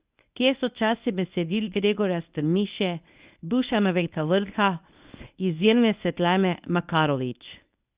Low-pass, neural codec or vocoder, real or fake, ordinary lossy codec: 3.6 kHz; codec, 24 kHz, 0.9 kbps, WavTokenizer, medium speech release version 1; fake; Opus, 64 kbps